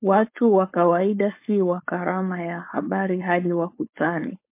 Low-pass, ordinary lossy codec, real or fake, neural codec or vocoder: 3.6 kHz; MP3, 24 kbps; fake; codec, 16 kHz, 4.8 kbps, FACodec